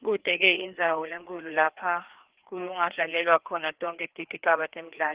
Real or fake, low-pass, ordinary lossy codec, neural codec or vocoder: fake; 3.6 kHz; Opus, 16 kbps; codec, 16 kHz, 2 kbps, FreqCodec, larger model